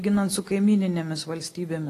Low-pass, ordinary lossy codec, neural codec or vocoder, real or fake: 14.4 kHz; AAC, 48 kbps; none; real